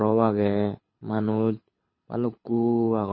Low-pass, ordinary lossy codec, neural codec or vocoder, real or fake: 7.2 kHz; MP3, 24 kbps; codec, 24 kHz, 6 kbps, HILCodec; fake